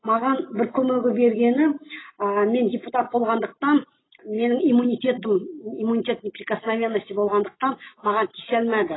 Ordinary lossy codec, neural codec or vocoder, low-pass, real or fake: AAC, 16 kbps; none; 7.2 kHz; real